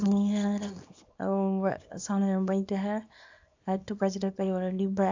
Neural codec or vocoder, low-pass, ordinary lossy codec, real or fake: codec, 24 kHz, 0.9 kbps, WavTokenizer, small release; 7.2 kHz; AAC, 48 kbps; fake